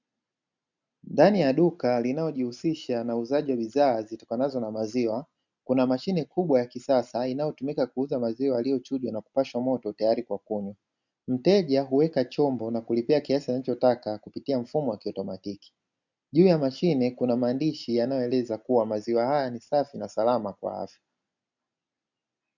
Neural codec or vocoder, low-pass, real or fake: none; 7.2 kHz; real